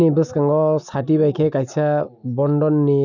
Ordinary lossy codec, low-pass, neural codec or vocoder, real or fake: none; 7.2 kHz; none; real